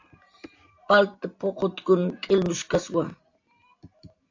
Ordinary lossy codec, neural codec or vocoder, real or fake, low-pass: AAC, 48 kbps; none; real; 7.2 kHz